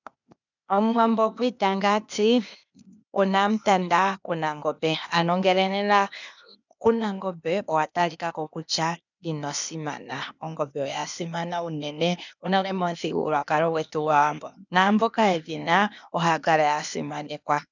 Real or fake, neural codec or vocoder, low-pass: fake; codec, 16 kHz, 0.8 kbps, ZipCodec; 7.2 kHz